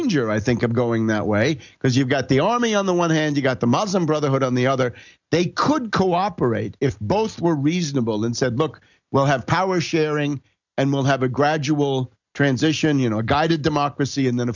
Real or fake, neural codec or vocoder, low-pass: real; none; 7.2 kHz